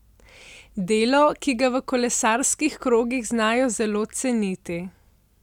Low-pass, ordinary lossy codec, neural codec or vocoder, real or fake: 19.8 kHz; none; none; real